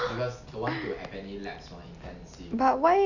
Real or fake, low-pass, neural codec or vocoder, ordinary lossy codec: real; 7.2 kHz; none; none